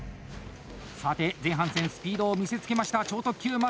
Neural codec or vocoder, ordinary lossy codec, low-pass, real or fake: none; none; none; real